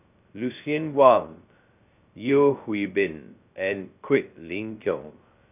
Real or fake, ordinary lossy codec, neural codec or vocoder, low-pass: fake; none; codec, 16 kHz, 0.2 kbps, FocalCodec; 3.6 kHz